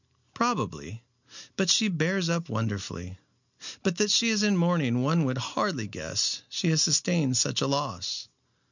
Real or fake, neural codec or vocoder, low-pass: real; none; 7.2 kHz